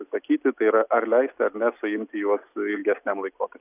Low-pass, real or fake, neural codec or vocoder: 3.6 kHz; real; none